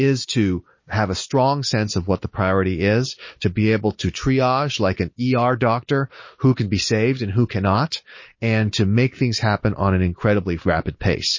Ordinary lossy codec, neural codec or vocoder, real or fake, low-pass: MP3, 32 kbps; none; real; 7.2 kHz